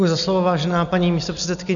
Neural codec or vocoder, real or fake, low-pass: none; real; 7.2 kHz